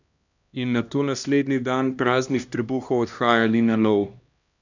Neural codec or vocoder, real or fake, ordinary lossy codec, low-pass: codec, 16 kHz, 1 kbps, X-Codec, HuBERT features, trained on LibriSpeech; fake; none; 7.2 kHz